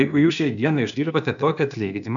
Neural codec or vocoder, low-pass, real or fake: codec, 16 kHz, 0.8 kbps, ZipCodec; 7.2 kHz; fake